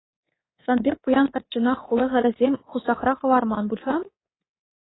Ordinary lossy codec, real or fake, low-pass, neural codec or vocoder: AAC, 16 kbps; fake; 7.2 kHz; codec, 24 kHz, 3.1 kbps, DualCodec